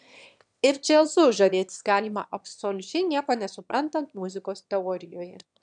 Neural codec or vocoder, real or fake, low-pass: autoencoder, 22.05 kHz, a latent of 192 numbers a frame, VITS, trained on one speaker; fake; 9.9 kHz